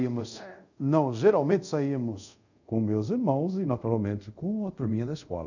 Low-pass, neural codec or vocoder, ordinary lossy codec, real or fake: 7.2 kHz; codec, 24 kHz, 0.5 kbps, DualCodec; none; fake